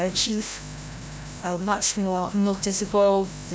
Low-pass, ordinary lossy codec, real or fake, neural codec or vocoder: none; none; fake; codec, 16 kHz, 0.5 kbps, FreqCodec, larger model